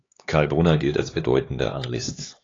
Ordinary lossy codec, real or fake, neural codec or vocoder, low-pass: AAC, 32 kbps; fake; codec, 16 kHz, 4 kbps, X-Codec, HuBERT features, trained on LibriSpeech; 7.2 kHz